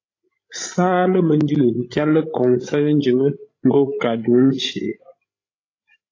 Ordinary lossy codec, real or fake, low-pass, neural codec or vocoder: AAC, 32 kbps; fake; 7.2 kHz; codec, 16 kHz, 16 kbps, FreqCodec, larger model